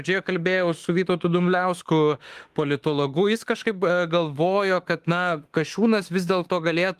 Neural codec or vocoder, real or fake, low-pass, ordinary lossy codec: autoencoder, 48 kHz, 32 numbers a frame, DAC-VAE, trained on Japanese speech; fake; 14.4 kHz; Opus, 24 kbps